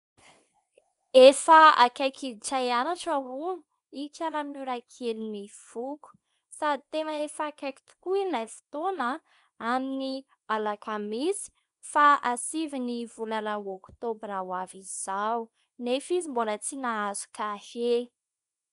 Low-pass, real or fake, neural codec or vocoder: 10.8 kHz; fake; codec, 24 kHz, 0.9 kbps, WavTokenizer, small release